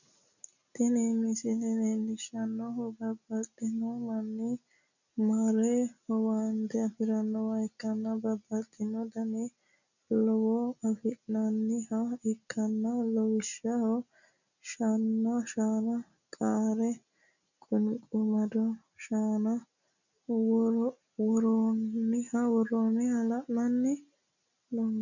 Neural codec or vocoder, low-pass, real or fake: none; 7.2 kHz; real